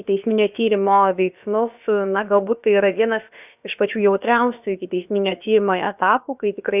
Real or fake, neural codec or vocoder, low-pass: fake; codec, 16 kHz, about 1 kbps, DyCAST, with the encoder's durations; 3.6 kHz